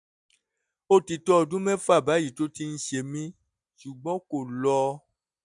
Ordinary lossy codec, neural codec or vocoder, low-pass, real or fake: none; none; 10.8 kHz; real